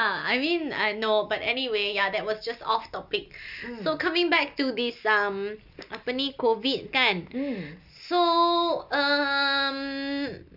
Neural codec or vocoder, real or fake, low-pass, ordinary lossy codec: none; real; 5.4 kHz; none